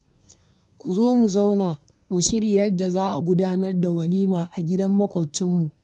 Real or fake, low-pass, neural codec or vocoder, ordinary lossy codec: fake; 10.8 kHz; codec, 24 kHz, 1 kbps, SNAC; none